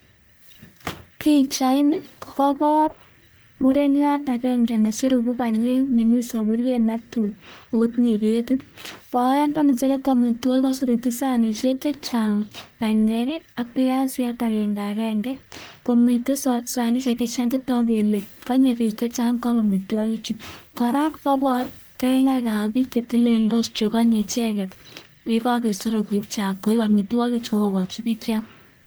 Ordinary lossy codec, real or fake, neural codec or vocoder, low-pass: none; fake; codec, 44.1 kHz, 1.7 kbps, Pupu-Codec; none